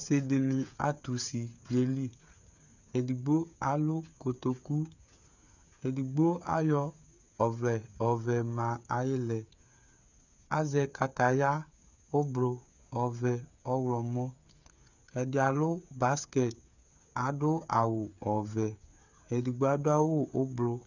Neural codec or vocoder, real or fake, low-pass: codec, 16 kHz, 8 kbps, FreqCodec, smaller model; fake; 7.2 kHz